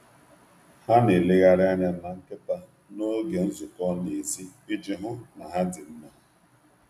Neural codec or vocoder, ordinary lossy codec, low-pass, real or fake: none; AAC, 96 kbps; 14.4 kHz; real